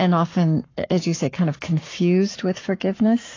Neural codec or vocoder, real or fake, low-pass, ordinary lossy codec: codec, 44.1 kHz, 7.8 kbps, Pupu-Codec; fake; 7.2 kHz; AAC, 32 kbps